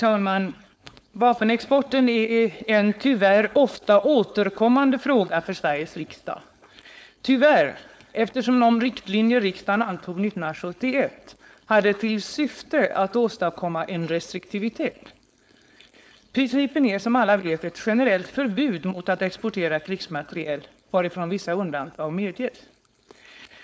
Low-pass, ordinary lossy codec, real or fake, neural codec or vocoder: none; none; fake; codec, 16 kHz, 4.8 kbps, FACodec